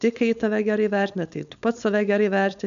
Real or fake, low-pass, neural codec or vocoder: fake; 7.2 kHz; codec, 16 kHz, 4.8 kbps, FACodec